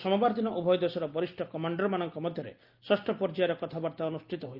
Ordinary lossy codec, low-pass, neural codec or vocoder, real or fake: Opus, 24 kbps; 5.4 kHz; none; real